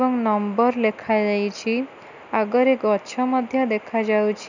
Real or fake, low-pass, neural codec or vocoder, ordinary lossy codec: real; 7.2 kHz; none; none